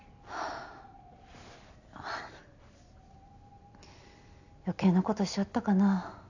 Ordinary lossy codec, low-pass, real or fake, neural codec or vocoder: none; 7.2 kHz; real; none